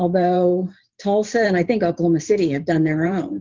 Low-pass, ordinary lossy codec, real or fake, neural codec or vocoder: 7.2 kHz; Opus, 24 kbps; fake; vocoder, 44.1 kHz, 128 mel bands every 512 samples, BigVGAN v2